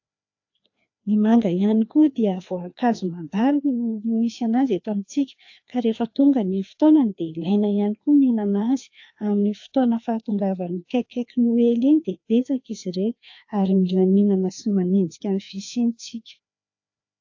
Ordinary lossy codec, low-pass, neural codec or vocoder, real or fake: AAC, 48 kbps; 7.2 kHz; codec, 16 kHz, 2 kbps, FreqCodec, larger model; fake